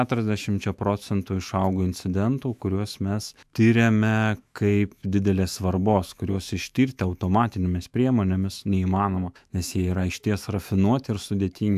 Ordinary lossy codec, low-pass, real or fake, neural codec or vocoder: AAC, 96 kbps; 14.4 kHz; fake; autoencoder, 48 kHz, 128 numbers a frame, DAC-VAE, trained on Japanese speech